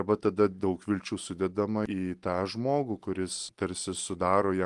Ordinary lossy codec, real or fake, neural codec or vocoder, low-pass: Opus, 24 kbps; real; none; 10.8 kHz